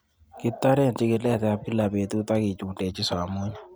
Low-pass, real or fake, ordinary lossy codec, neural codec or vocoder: none; real; none; none